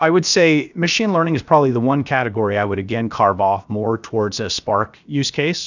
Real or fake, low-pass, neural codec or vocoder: fake; 7.2 kHz; codec, 16 kHz, 0.7 kbps, FocalCodec